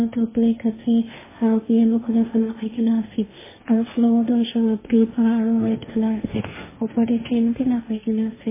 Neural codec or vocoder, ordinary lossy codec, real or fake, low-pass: codec, 16 kHz, 1.1 kbps, Voila-Tokenizer; MP3, 16 kbps; fake; 3.6 kHz